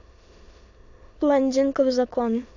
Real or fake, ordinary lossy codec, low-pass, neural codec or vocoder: fake; AAC, 48 kbps; 7.2 kHz; autoencoder, 22.05 kHz, a latent of 192 numbers a frame, VITS, trained on many speakers